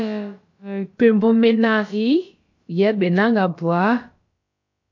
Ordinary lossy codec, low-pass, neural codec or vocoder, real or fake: MP3, 48 kbps; 7.2 kHz; codec, 16 kHz, about 1 kbps, DyCAST, with the encoder's durations; fake